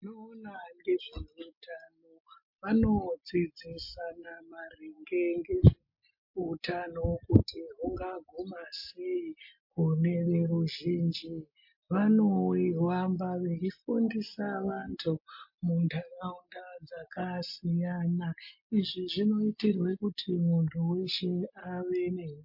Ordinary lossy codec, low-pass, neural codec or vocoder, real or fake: MP3, 32 kbps; 5.4 kHz; none; real